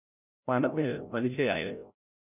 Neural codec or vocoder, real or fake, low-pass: codec, 16 kHz, 0.5 kbps, FreqCodec, larger model; fake; 3.6 kHz